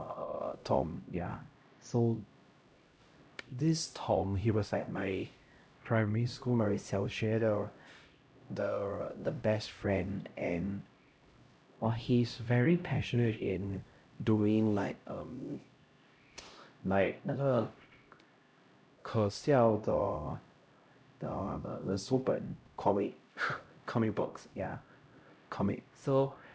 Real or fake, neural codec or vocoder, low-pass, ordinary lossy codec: fake; codec, 16 kHz, 0.5 kbps, X-Codec, HuBERT features, trained on LibriSpeech; none; none